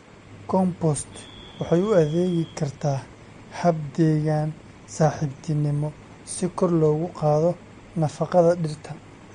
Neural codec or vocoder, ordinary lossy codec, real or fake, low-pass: none; MP3, 48 kbps; real; 9.9 kHz